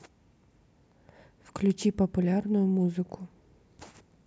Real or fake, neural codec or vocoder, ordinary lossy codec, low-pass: real; none; none; none